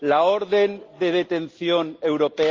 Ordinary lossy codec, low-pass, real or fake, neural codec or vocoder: Opus, 32 kbps; 7.2 kHz; real; none